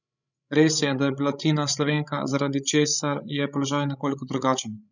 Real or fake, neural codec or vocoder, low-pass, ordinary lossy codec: fake; codec, 16 kHz, 16 kbps, FreqCodec, larger model; 7.2 kHz; none